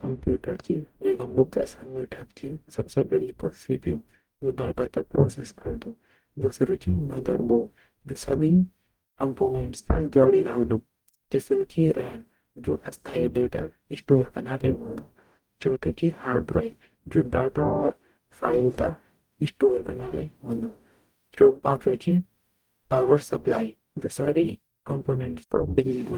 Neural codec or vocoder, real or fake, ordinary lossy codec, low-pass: codec, 44.1 kHz, 0.9 kbps, DAC; fake; Opus, 32 kbps; 19.8 kHz